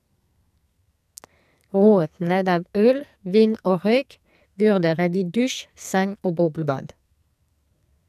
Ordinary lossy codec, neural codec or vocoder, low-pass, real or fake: none; codec, 44.1 kHz, 2.6 kbps, SNAC; 14.4 kHz; fake